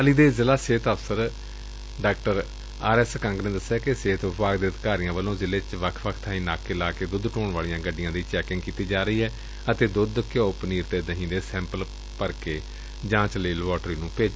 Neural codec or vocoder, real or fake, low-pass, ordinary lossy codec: none; real; none; none